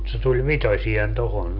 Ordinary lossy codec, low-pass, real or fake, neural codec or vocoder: none; 5.4 kHz; real; none